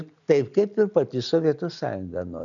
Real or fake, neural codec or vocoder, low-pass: real; none; 7.2 kHz